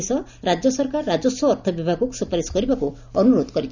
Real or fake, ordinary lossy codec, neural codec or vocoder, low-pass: real; none; none; 7.2 kHz